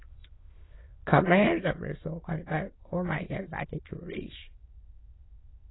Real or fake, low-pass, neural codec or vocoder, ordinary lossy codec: fake; 7.2 kHz; autoencoder, 22.05 kHz, a latent of 192 numbers a frame, VITS, trained on many speakers; AAC, 16 kbps